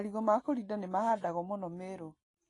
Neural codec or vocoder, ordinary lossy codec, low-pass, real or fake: none; AAC, 32 kbps; 10.8 kHz; real